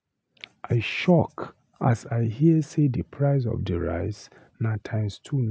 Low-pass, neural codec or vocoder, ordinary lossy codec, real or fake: none; none; none; real